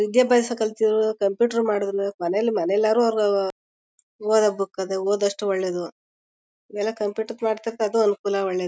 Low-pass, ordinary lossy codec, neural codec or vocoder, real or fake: none; none; none; real